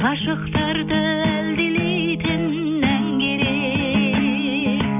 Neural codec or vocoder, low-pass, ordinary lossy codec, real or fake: none; 3.6 kHz; none; real